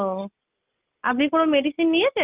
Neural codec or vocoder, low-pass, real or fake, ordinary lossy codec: none; 3.6 kHz; real; Opus, 24 kbps